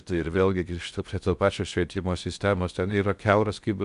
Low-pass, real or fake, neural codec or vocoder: 10.8 kHz; fake; codec, 16 kHz in and 24 kHz out, 0.6 kbps, FocalCodec, streaming, 4096 codes